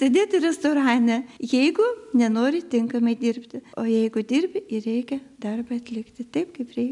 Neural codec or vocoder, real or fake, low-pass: none; real; 10.8 kHz